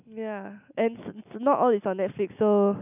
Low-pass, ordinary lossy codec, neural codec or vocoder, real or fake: 3.6 kHz; none; none; real